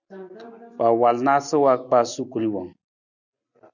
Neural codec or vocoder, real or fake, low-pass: none; real; 7.2 kHz